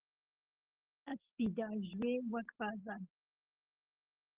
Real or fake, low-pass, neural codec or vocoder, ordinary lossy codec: real; 3.6 kHz; none; Opus, 16 kbps